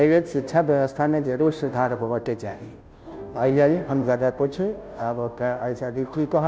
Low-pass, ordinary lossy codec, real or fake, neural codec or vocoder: none; none; fake; codec, 16 kHz, 0.5 kbps, FunCodec, trained on Chinese and English, 25 frames a second